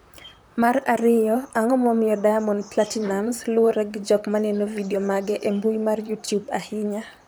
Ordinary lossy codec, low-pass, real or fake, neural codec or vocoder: none; none; fake; vocoder, 44.1 kHz, 128 mel bands, Pupu-Vocoder